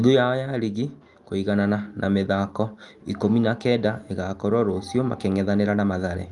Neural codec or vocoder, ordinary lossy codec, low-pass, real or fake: none; Opus, 32 kbps; 10.8 kHz; real